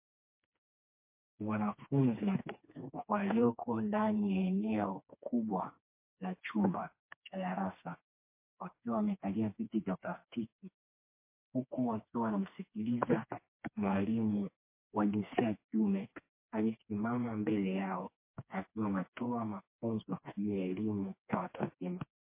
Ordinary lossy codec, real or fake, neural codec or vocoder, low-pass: MP3, 24 kbps; fake; codec, 16 kHz, 2 kbps, FreqCodec, smaller model; 3.6 kHz